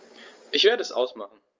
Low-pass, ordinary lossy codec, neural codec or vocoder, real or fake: 7.2 kHz; Opus, 32 kbps; none; real